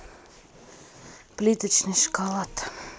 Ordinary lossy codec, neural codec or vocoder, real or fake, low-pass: none; none; real; none